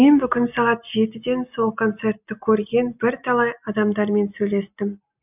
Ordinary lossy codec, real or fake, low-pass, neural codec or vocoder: none; real; 3.6 kHz; none